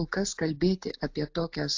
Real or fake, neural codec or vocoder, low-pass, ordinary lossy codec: real; none; 7.2 kHz; AAC, 48 kbps